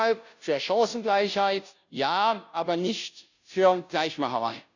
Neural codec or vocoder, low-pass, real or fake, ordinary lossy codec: codec, 16 kHz, 0.5 kbps, FunCodec, trained on Chinese and English, 25 frames a second; 7.2 kHz; fake; none